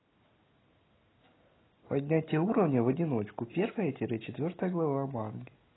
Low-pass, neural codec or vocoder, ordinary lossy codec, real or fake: 7.2 kHz; none; AAC, 16 kbps; real